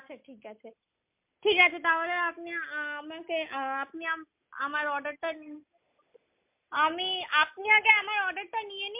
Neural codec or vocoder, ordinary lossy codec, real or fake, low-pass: none; MP3, 32 kbps; real; 3.6 kHz